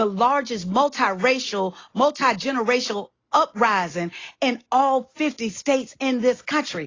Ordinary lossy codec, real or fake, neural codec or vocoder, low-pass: AAC, 32 kbps; real; none; 7.2 kHz